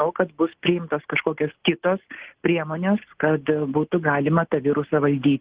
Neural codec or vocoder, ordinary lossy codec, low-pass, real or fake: none; Opus, 16 kbps; 3.6 kHz; real